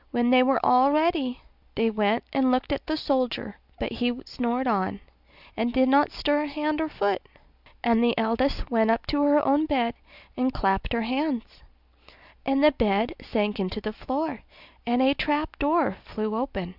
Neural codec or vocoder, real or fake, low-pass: none; real; 5.4 kHz